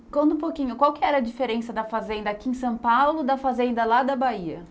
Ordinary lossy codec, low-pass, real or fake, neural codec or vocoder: none; none; real; none